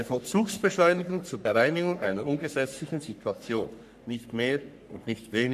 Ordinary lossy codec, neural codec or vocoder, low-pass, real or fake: none; codec, 44.1 kHz, 3.4 kbps, Pupu-Codec; 14.4 kHz; fake